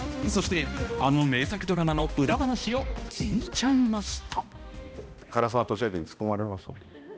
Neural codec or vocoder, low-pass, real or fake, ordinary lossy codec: codec, 16 kHz, 1 kbps, X-Codec, HuBERT features, trained on balanced general audio; none; fake; none